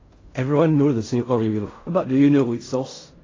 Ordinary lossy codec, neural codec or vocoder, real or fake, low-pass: AAC, 32 kbps; codec, 16 kHz in and 24 kHz out, 0.4 kbps, LongCat-Audio-Codec, fine tuned four codebook decoder; fake; 7.2 kHz